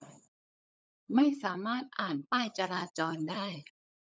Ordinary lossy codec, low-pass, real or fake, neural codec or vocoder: none; none; fake; codec, 16 kHz, 16 kbps, FunCodec, trained on LibriTTS, 50 frames a second